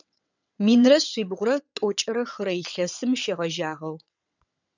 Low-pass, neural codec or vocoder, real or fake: 7.2 kHz; vocoder, 22.05 kHz, 80 mel bands, WaveNeXt; fake